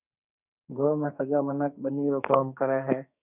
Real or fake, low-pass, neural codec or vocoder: fake; 3.6 kHz; codec, 44.1 kHz, 2.6 kbps, SNAC